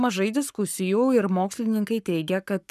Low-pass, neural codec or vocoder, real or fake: 14.4 kHz; codec, 44.1 kHz, 7.8 kbps, Pupu-Codec; fake